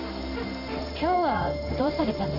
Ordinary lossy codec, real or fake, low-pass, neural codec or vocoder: none; fake; 5.4 kHz; codec, 16 kHz in and 24 kHz out, 1 kbps, XY-Tokenizer